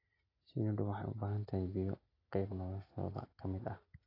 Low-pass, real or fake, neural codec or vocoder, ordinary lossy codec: 5.4 kHz; real; none; AAC, 24 kbps